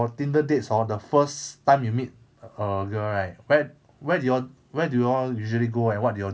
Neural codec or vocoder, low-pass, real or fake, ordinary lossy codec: none; none; real; none